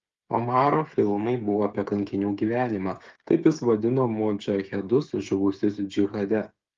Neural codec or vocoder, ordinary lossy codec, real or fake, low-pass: codec, 16 kHz, 8 kbps, FreqCodec, smaller model; Opus, 32 kbps; fake; 7.2 kHz